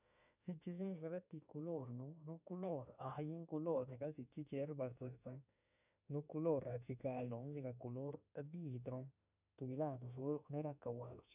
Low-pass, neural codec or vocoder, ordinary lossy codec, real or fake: 3.6 kHz; autoencoder, 48 kHz, 32 numbers a frame, DAC-VAE, trained on Japanese speech; none; fake